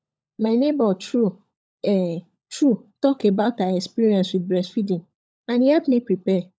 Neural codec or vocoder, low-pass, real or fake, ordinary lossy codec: codec, 16 kHz, 16 kbps, FunCodec, trained on LibriTTS, 50 frames a second; none; fake; none